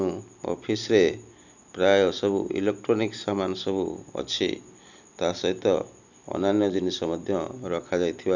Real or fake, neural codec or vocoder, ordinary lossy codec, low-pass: real; none; none; 7.2 kHz